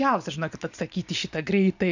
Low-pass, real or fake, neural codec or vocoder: 7.2 kHz; fake; vocoder, 44.1 kHz, 128 mel bands every 256 samples, BigVGAN v2